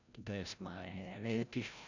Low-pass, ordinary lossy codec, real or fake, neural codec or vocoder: 7.2 kHz; none; fake; codec, 16 kHz, 0.5 kbps, FreqCodec, larger model